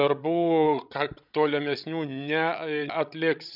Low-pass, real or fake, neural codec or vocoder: 5.4 kHz; fake; codec, 16 kHz, 16 kbps, FreqCodec, larger model